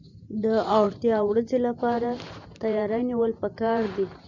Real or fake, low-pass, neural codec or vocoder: fake; 7.2 kHz; vocoder, 44.1 kHz, 128 mel bands every 512 samples, BigVGAN v2